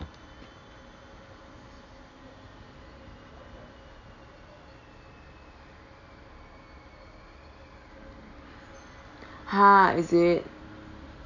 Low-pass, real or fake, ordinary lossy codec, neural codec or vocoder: 7.2 kHz; real; none; none